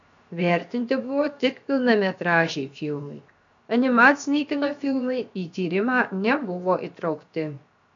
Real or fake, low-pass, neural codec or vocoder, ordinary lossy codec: fake; 7.2 kHz; codec, 16 kHz, 0.7 kbps, FocalCodec; AAC, 64 kbps